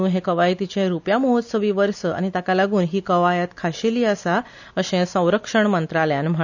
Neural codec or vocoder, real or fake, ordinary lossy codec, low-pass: none; real; none; 7.2 kHz